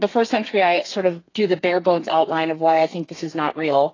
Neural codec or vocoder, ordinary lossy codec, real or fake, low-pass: codec, 32 kHz, 1.9 kbps, SNAC; AAC, 32 kbps; fake; 7.2 kHz